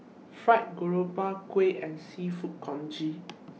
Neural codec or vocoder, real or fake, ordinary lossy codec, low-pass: none; real; none; none